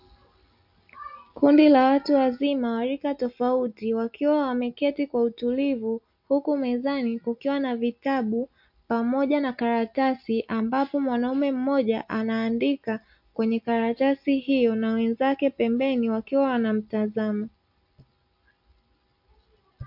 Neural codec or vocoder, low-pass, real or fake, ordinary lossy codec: none; 5.4 kHz; real; MP3, 48 kbps